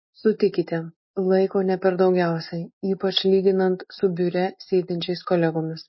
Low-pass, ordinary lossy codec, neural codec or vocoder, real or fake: 7.2 kHz; MP3, 24 kbps; none; real